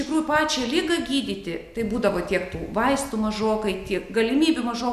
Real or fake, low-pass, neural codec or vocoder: real; 14.4 kHz; none